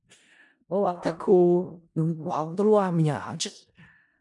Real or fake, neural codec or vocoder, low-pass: fake; codec, 16 kHz in and 24 kHz out, 0.4 kbps, LongCat-Audio-Codec, four codebook decoder; 10.8 kHz